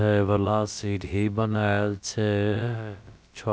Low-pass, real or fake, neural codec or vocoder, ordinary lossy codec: none; fake; codec, 16 kHz, about 1 kbps, DyCAST, with the encoder's durations; none